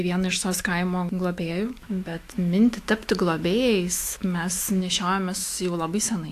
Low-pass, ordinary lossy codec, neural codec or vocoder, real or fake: 14.4 kHz; AAC, 64 kbps; autoencoder, 48 kHz, 128 numbers a frame, DAC-VAE, trained on Japanese speech; fake